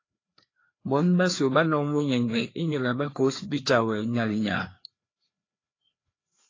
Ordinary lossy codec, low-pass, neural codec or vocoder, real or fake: AAC, 32 kbps; 7.2 kHz; codec, 16 kHz, 2 kbps, FreqCodec, larger model; fake